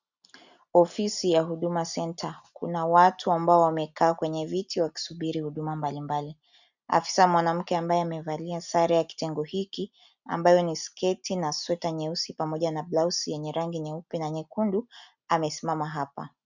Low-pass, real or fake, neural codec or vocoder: 7.2 kHz; real; none